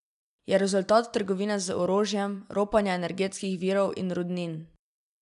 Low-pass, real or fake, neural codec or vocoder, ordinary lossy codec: 10.8 kHz; real; none; none